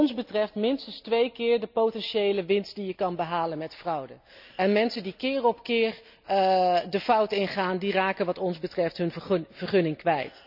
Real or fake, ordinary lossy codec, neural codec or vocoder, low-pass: real; none; none; 5.4 kHz